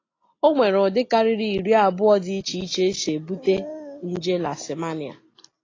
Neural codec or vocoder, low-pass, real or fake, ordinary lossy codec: none; 7.2 kHz; real; AAC, 32 kbps